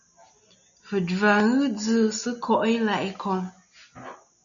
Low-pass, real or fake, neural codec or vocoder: 7.2 kHz; real; none